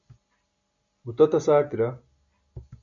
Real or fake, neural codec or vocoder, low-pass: real; none; 7.2 kHz